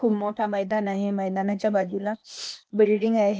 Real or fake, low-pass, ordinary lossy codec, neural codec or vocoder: fake; none; none; codec, 16 kHz, 0.8 kbps, ZipCodec